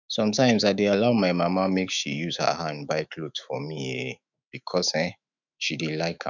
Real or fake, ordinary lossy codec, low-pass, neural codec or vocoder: fake; none; 7.2 kHz; autoencoder, 48 kHz, 128 numbers a frame, DAC-VAE, trained on Japanese speech